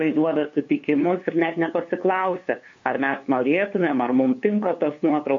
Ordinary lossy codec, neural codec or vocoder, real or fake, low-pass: MP3, 48 kbps; codec, 16 kHz, 2 kbps, FunCodec, trained on LibriTTS, 25 frames a second; fake; 7.2 kHz